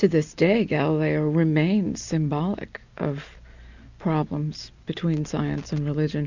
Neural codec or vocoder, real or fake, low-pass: none; real; 7.2 kHz